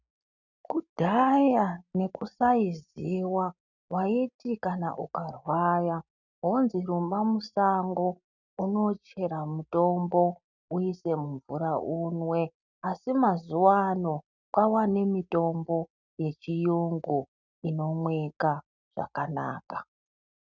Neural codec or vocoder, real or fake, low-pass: none; real; 7.2 kHz